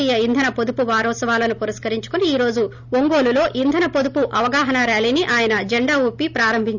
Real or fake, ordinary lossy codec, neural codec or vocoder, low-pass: real; none; none; 7.2 kHz